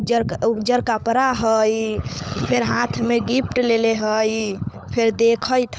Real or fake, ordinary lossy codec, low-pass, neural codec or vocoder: fake; none; none; codec, 16 kHz, 16 kbps, FunCodec, trained on LibriTTS, 50 frames a second